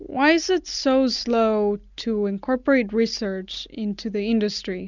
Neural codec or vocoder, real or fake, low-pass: none; real; 7.2 kHz